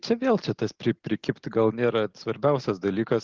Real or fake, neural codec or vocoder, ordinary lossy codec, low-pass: real; none; Opus, 16 kbps; 7.2 kHz